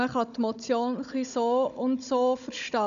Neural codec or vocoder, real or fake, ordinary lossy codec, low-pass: codec, 16 kHz, 16 kbps, FunCodec, trained on Chinese and English, 50 frames a second; fake; none; 7.2 kHz